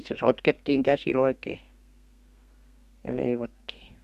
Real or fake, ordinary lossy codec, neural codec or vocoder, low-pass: fake; Opus, 64 kbps; codec, 32 kHz, 1.9 kbps, SNAC; 14.4 kHz